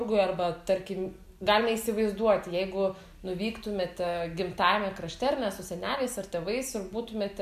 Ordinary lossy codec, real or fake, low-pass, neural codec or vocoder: MP3, 96 kbps; real; 14.4 kHz; none